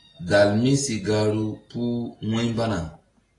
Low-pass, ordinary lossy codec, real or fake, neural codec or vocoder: 10.8 kHz; AAC, 32 kbps; real; none